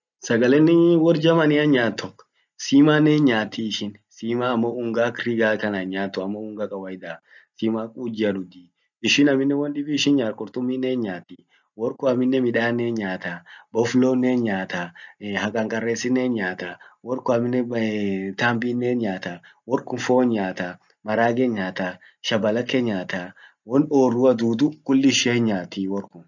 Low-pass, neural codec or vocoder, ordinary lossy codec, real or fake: 7.2 kHz; none; none; real